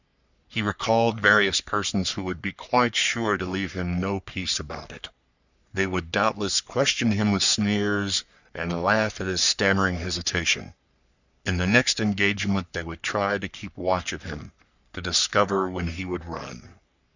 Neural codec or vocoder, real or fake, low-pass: codec, 44.1 kHz, 3.4 kbps, Pupu-Codec; fake; 7.2 kHz